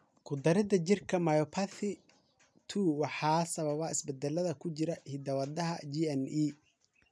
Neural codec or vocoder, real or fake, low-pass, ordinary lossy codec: none; real; none; none